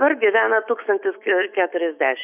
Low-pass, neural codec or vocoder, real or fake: 3.6 kHz; autoencoder, 48 kHz, 128 numbers a frame, DAC-VAE, trained on Japanese speech; fake